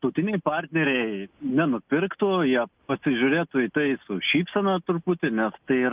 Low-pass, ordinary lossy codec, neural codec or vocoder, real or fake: 3.6 kHz; Opus, 24 kbps; none; real